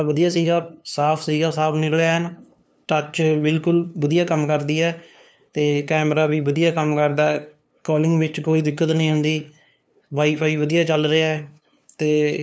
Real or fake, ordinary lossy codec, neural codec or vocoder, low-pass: fake; none; codec, 16 kHz, 2 kbps, FunCodec, trained on LibriTTS, 25 frames a second; none